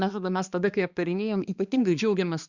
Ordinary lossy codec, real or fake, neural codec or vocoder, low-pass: Opus, 64 kbps; fake; codec, 16 kHz, 2 kbps, X-Codec, HuBERT features, trained on balanced general audio; 7.2 kHz